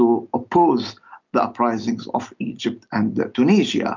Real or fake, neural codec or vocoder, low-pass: real; none; 7.2 kHz